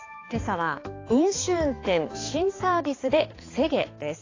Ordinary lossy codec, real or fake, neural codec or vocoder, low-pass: AAC, 32 kbps; fake; codec, 16 kHz, 4 kbps, X-Codec, HuBERT features, trained on balanced general audio; 7.2 kHz